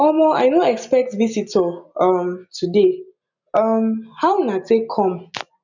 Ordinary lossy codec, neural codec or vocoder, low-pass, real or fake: none; none; 7.2 kHz; real